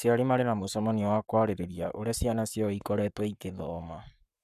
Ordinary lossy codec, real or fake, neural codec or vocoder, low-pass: none; fake; codec, 44.1 kHz, 7.8 kbps, Pupu-Codec; 14.4 kHz